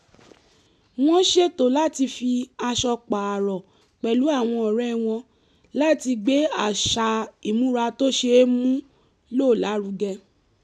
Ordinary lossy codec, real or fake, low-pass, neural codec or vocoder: none; real; none; none